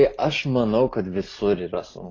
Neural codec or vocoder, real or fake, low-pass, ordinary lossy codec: none; real; 7.2 kHz; AAC, 32 kbps